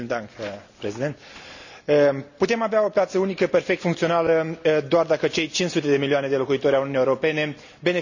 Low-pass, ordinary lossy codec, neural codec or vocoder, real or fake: 7.2 kHz; none; none; real